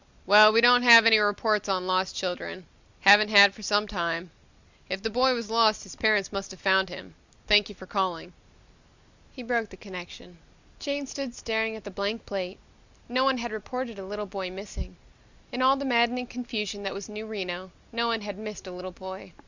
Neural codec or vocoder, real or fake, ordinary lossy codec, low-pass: none; real; Opus, 64 kbps; 7.2 kHz